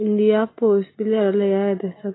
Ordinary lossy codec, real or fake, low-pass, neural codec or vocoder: AAC, 16 kbps; real; 7.2 kHz; none